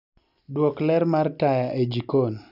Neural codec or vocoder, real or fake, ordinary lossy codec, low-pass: none; real; none; 5.4 kHz